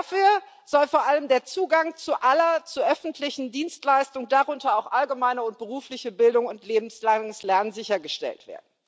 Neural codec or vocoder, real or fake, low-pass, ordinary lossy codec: none; real; none; none